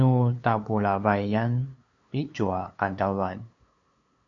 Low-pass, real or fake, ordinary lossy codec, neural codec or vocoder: 7.2 kHz; fake; MP3, 64 kbps; codec, 16 kHz, 2 kbps, FunCodec, trained on LibriTTS, 25 frames a second